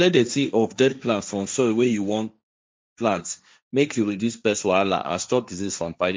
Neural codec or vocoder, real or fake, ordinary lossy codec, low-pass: codec, 16 kHz, 1.1 kbps, Voila-Tokenizer; fake; none; none